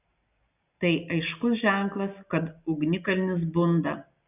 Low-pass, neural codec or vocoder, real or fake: 3.6 kHz; none; real